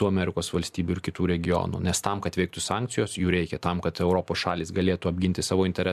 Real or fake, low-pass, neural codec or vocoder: real; 14.4 kHz; none